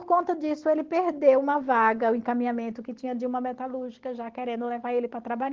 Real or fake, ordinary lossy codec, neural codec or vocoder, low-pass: real; Opus, 16 kbps; none; 7.2 kHz